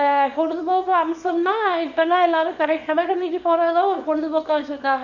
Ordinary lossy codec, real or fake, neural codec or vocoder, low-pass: none; fake; codec, 24 kHz, 0.9 kbps, WavTokenizer, small release; 7.2 kHz